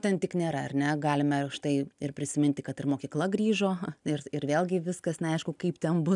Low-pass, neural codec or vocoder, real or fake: 10.8 kHz; none; real